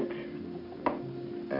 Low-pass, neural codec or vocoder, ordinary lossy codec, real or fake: 5.4 kHz; none; MP3, 48 kbps; real